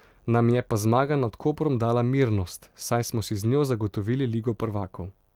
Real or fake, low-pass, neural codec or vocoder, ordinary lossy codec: real; 19.8 kHz; none; Opus, 32 kbps